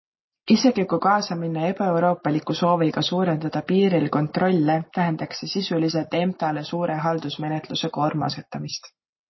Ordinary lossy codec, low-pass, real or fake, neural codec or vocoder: MP3, 24 kbps; 7.2 kHz; real; none